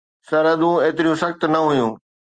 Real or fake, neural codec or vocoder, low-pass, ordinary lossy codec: real; none; 9.9 kHz; Opus, 32 kbps